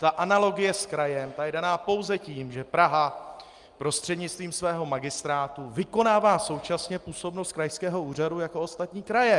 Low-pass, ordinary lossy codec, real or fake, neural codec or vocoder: 10.8 kHz; Opus, 32 kbps; real; none